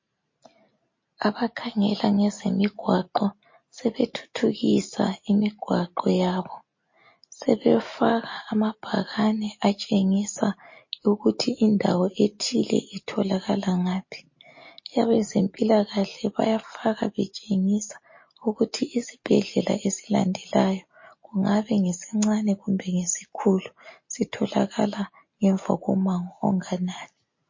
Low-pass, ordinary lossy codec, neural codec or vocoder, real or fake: 7.2 kHz; MP3, 32 kbps; none; real